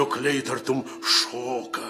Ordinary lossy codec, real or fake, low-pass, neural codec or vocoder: AAC, 48 kbps; real; 14.4 kHz; none